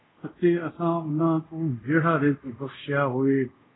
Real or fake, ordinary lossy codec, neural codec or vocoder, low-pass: fake; AAC, 16 kbps; codec, 24 kHz, 0.5 kbps, DualCodec; 7.2 kHz